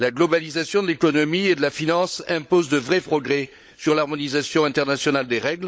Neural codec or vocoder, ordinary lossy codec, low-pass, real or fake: codec, 16 kHz, 16 kbps, FunCodec, trained on LibriTTS, 50 frames a second; none; none; fake